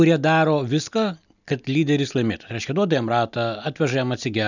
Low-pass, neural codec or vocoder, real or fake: 7.2 kHz; none; real